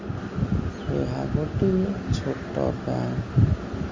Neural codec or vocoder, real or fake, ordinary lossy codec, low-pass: none; real; Opus, 32 kbps; 7.2 kHz